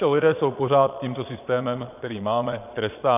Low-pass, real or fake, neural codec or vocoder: 3.6 kHz; fake; vocoder, 22.05 kHz, 80 mel bands, Vocos